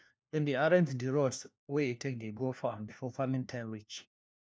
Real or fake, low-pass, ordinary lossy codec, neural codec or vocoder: fake; none; none; codec, 16 kHz, 1 kbps, FunCodec, trained on LibriTTS, 50 frames a second